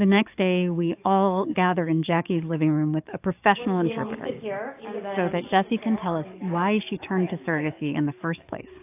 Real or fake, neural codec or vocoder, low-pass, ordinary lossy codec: fake; codec, 44.1 kHz, 7.8 kbps, DAC; 3.6 kHz; AAC, 32 kbps